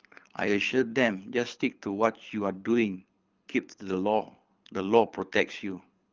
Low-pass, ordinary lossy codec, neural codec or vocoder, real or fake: 7.2 kHz; Opus, 24 kbps; codec, 24 kHz, 6 kbps, HILCodec; fake